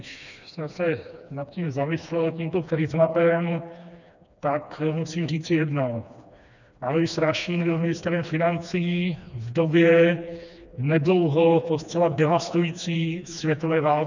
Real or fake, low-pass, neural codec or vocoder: fake; 7.2 kHz; codec, 16 kHz, 2 kbps, FreqCodec, smaller model